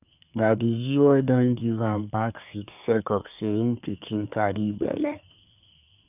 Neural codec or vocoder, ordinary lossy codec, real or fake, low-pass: codec, 24 kHz, 1 kbps, SNAC; none; fake; 3.6 kHz